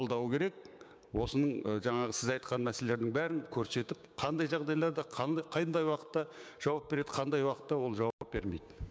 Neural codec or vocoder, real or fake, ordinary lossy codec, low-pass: codec, 16 kHz, 6 kbps, DAC; fake; none; none